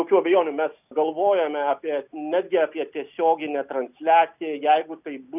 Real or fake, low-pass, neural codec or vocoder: real; 3.6 kHz; none